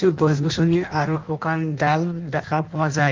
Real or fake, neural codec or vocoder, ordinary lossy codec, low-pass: fake; codec, 16 kHz in and 24 kHz out, 0.6 kbps, FireRedTTS-2 codec; Opus, 32 kbps; 7.2 kHz